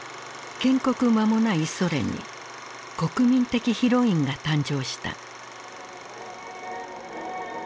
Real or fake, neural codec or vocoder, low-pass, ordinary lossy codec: real; none; none; none